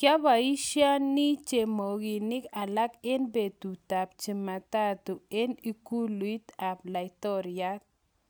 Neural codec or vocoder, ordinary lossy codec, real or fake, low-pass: none; none; real; none